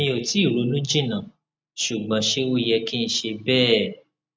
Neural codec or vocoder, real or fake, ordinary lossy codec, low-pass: none; real; none; none